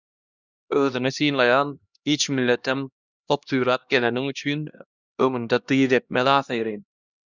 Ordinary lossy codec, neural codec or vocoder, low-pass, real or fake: Opus, 64 kbps; codec, 16 kHz, 1 kbps, X-Codec, HuBERT features, trained on LibriSpeech; 7.2 kHz; fake